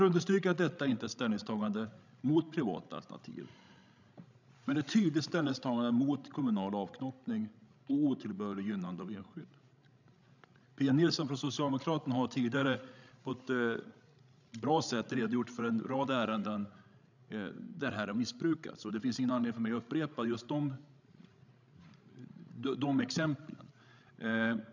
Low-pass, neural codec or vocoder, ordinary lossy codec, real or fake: 7.2 kHz; codec, 16 kHz, 16 kbps, FreqCodec, larger model; none; fake